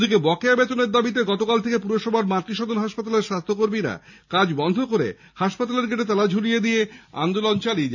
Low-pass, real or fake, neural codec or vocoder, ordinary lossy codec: 7.2 kHz; real; none; none